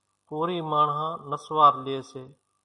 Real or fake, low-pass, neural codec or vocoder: real; 10.8 kHz; none